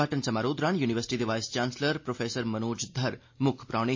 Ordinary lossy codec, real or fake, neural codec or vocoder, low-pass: MP3, 32 kbps; real; none; 7.2 kHz